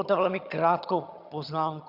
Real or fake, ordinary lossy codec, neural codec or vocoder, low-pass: fake; Opus, 64 kbps; vocoder, 22.05 kHz, 80 mel bands, HiFi-GAN; 5.4 kHz